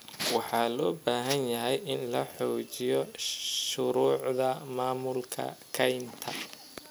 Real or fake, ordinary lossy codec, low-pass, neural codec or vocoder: real; none; none; none